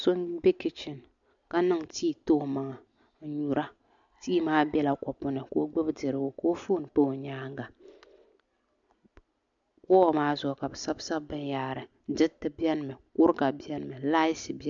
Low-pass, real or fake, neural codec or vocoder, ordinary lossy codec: 7.2 kHz; real; none; MP3, 64 kbps